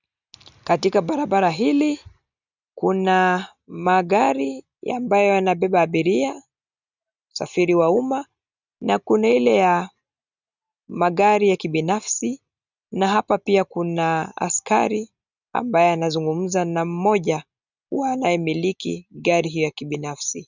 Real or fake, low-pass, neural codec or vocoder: real; 7.2 kHz; none